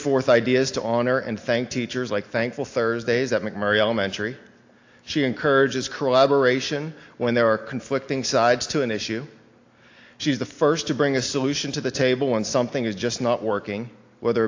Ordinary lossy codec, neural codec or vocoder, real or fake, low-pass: AAC, 48 kbps; none; real; 7.2 kHz